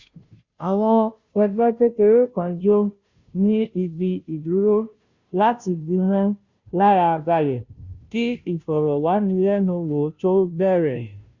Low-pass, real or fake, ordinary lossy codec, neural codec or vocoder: 7.2 kHz; fake; Opus, 64 kbps; codec, 16 kHz, 0.5 kbps, FunCodec, trained on Chinese and English, 25 frames a second